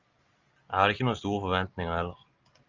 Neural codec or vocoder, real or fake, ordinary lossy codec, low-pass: none; real; Opus, 32 kbps; 7.2 kHz